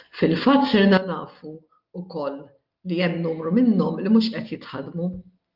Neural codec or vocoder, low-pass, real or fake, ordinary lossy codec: none; 5.4 kHz; real; Opus, 24 kbps